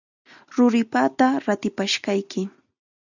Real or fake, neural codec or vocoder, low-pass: real; none; 7.2 kHz